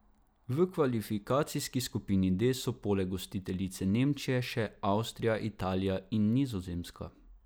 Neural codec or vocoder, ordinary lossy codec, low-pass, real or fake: none; none; none; real